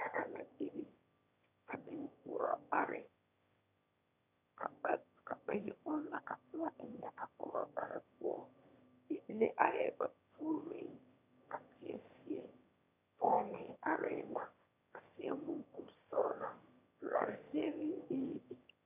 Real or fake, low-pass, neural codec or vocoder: fake; 3.6 kHz; autoencoder, 22.05 kHz, a latent of 192 numbers a frame, VITS, trained on one speaker